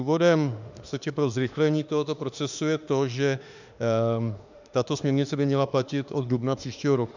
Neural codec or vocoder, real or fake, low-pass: autoencoder, 48 kHz, 32 numbers a frame, DAC-VAE, trained on Japanese speech; fake; 7.2 kHz